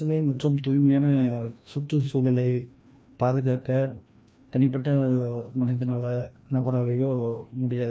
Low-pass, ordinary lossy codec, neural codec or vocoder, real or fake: none; none; codec, 16 kHz, 1 kbps, FreqCodec, larger model; fake